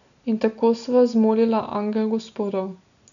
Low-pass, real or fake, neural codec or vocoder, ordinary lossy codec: 7.2 kHz; real; none; none